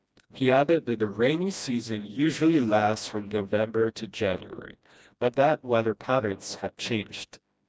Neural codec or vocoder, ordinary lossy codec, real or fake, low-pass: codec, 16 kHz, 1 kbps, FreqCodec, smaller model; none; fake; none